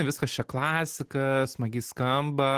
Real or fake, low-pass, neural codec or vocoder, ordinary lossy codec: real; 14.4 kHz; none; Opus, 16 kbps